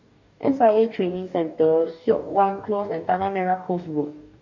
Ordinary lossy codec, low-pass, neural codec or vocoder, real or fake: none; 7.2 kHz; codec, 44.1 kHz, 2.6 kbps, DAC; fake